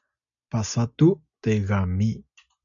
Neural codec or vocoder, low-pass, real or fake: none; 7.2 kHz; real